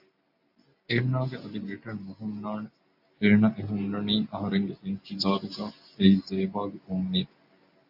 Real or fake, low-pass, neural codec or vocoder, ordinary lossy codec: real; 5.4 kHz; none; AAC, 48 kbps